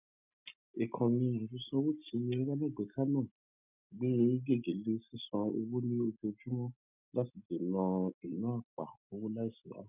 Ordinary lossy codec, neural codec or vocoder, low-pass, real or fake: none; none; 3.6 kHz; real